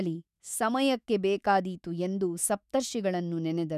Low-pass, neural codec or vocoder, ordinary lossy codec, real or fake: 14.4 kHz; autoencoder, 48 kHz, 128 numbers a frame, DAC-VAE, trained on Japanese speech; none; fake